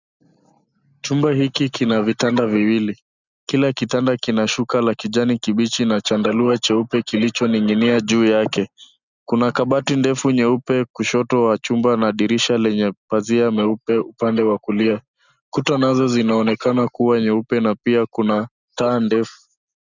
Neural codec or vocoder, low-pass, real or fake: none; 7.2 kHz; real